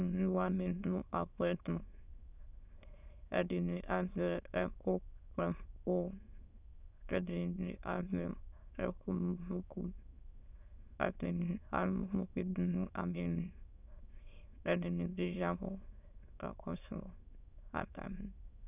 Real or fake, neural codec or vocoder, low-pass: fake; autoencoder, 22.05 kHz, a latent of 192 numbers a frame, VITS, trained on many speakers; 3.6 kHz